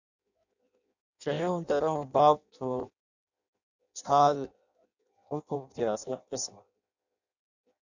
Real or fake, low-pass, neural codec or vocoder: fake; 7.2 kHz; codec, 16 kHz in and 24 kHz out, 0.6 kbps, FireRedTTS-2 codec